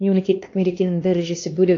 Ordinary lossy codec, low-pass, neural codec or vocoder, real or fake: AAC, 48 kbps; 7.2 kHz; codec, 16 kHz, 2 kbps, X-Codec, HuBERT features, trained on LibriSpeech; fake